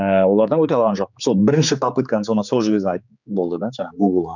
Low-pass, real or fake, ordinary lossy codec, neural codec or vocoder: 7.2 kHz; fake; none; codec, 16 kHz, 4 kbps, X-Codec, HuBERT features, trained on general audio